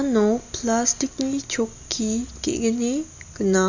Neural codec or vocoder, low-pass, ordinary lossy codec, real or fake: none; 7.2 kHz; Opus, 64 kbps; real